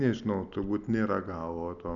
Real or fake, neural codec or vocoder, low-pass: real; none; 7.2 kHz